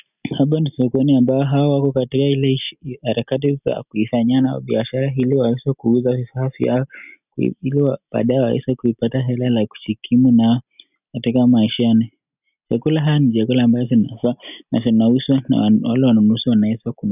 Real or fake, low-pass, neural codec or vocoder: real; 3.6 kHz; none